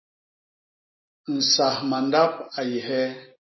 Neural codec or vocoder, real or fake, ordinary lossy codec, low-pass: none; real; MP3, 24 kbps; 7.2 kHz